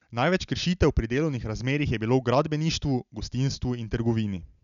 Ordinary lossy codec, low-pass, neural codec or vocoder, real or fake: none; 7.2 kHz; none; real